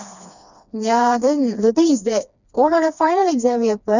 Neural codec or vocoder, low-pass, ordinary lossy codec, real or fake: codec, 16 kHz, 2 kbps, FreqCodec, smaller model; 7.2 kHz; none; fake